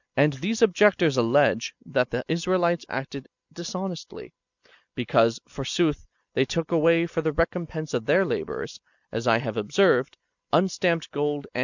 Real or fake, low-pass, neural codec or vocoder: real; 7.2 kHz; none